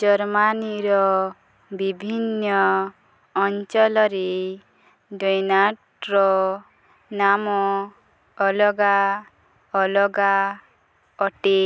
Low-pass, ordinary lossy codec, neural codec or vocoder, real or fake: none; none; none; real